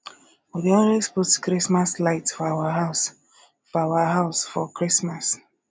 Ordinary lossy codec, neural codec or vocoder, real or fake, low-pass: none; none; real; none